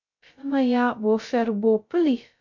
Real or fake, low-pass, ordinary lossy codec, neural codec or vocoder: fake; 7.2 kHz; MP3, 64 kbps; codec, 16 kHz, 0.2 kbps, FocalCodec